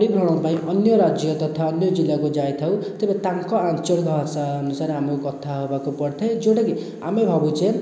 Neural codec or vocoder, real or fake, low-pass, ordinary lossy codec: none; real; none; none